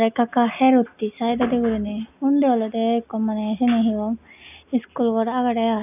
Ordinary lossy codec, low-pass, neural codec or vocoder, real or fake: none; 3.6 kHz; none; real